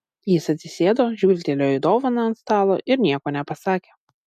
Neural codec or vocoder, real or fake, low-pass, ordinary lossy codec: none; real; 14.4 kHz; MP3, 96 kbps